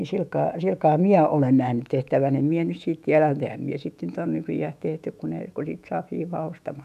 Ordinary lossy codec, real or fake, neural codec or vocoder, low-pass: none; fake; autoencoder, 48 kHz, 128 numbers a frame, DAC-VAE, trained on Japanese speech; 14.4 kHz